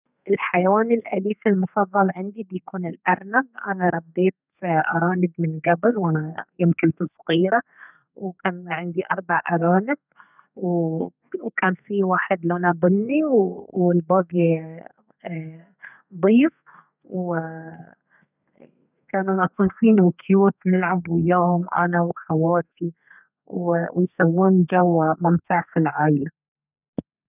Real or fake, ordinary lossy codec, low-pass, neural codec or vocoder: fake; none; 3.6 kHz; codec, 32 kHz, 1.9 kbps, SNAC